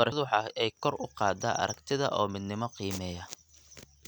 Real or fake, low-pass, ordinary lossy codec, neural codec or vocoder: real; none; none; none